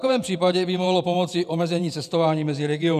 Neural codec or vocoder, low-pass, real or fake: vocoder, 48 kHz, 128 mel bands, Vocos; 14.4 kHz; fake